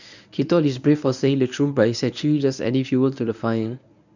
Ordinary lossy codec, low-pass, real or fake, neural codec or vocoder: none; 7.2 kHz; fake; codec, 24 kHz, 0.9 kbps, WavTokenizer, medium speech release version 1